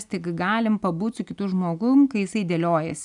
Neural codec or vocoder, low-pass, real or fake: none; 10.8 kHz; real